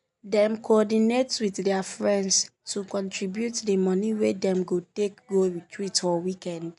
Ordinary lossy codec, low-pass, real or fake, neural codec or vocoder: none; 10.8 kHz; real; none